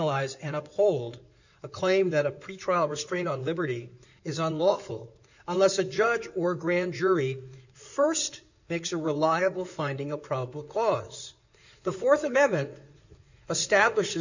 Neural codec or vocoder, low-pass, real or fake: codec, 16 kHz in and 24 kHz out, 2.2 kbps, FireRedTTS-2 codec; 7.2 kHz; fake